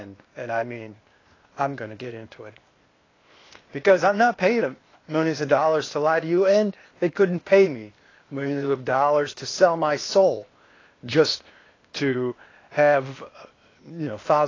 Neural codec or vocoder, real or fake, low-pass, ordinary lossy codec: codec, 16 kHz, 0.8 kbps, ZipCodec; fake; 7.2 kHz; AAC, 32 kbps